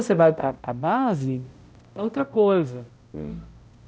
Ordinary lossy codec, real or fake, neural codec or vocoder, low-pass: none; fake; codec, 16 kHz, 0.5 kbps, X-Codec, HuBERT features, trained on balanced general audio; none